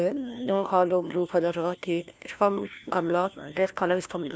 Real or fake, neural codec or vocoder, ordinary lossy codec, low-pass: fake; codec, 16 kHz, 1 kbps, FunCodec, trained on LibriTTS, 50 frames a second; none; none